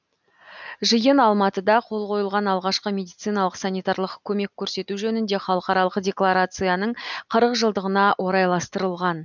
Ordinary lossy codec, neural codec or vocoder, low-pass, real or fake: none; none; 7.2 kHz; real